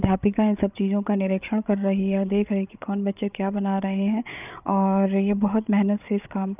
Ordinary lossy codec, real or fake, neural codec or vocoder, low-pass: none; fake; codec, 16 kHz, 8 kbps, FreqCodec, larger model; 3.6 kHz